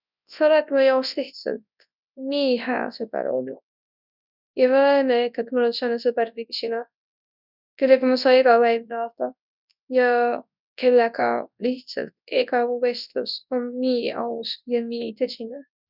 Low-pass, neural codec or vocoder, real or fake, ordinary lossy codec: 5.4 kHz; codec, 24 kHz, 0.9 kbps, WavTokenizer, large speech release; fake; none